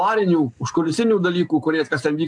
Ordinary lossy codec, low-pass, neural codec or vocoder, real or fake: AAC, 64 kbps; 9.9 kHz; none; real